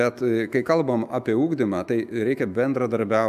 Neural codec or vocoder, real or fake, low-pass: autoencoder, 48 kHz, 128 numbers a frame, DAC-VAE, trained on Japanese speech; fake; 14.4 kHz